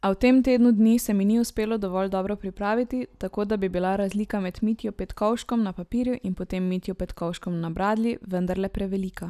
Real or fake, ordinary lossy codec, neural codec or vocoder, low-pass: real; none; none; 14.4 kHz